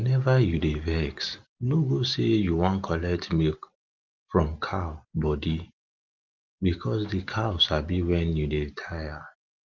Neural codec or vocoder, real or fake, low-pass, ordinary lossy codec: none; real; 7.2 kHz; Opus, 32 kbps